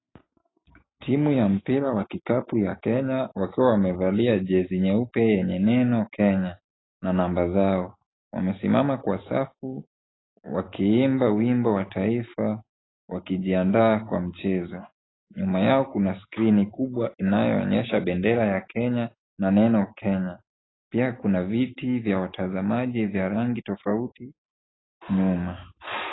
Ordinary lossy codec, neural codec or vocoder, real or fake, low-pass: AAC, 16 kbps; none; real; 7.2 kHz